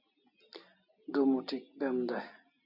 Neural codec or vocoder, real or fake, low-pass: none; real; 5.4 kHz